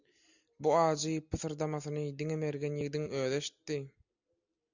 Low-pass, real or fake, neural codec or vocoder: 7.2 kHz; real; none